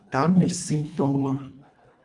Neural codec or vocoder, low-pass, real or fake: codec, 24 kHz, 1.5 kbps, HILCodec; 10.8 kHz; fake